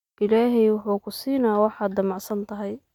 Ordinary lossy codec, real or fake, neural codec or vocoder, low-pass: MP3, 96 kbps; real; none; 19.8 kHz